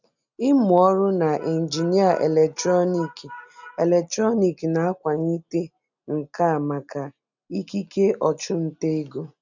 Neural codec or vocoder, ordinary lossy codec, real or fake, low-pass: none; none; real; 7.2 kHz